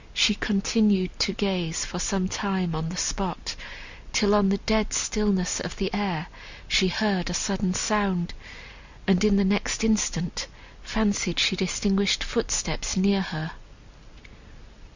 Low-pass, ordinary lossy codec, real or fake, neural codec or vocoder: 7.2 kHz; Opus, 64 kbps; real; none